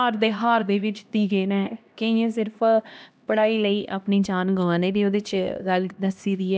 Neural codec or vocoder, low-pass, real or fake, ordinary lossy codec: codec, 16 kHz, 1 kbps, X-Codec, HuBERT features, trained on LibriSpeech; none; fake; none